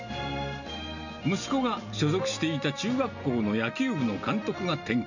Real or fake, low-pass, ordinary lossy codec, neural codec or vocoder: real; 7.2 kHz; none; none